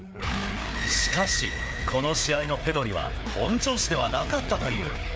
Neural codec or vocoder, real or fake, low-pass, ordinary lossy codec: codec, 16 kHz, 4 kbps, FreqCodec, larger model; fake; none; none